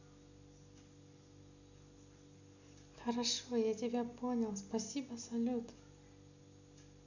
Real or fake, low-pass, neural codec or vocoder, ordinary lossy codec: real; 7.2 kHz; none; none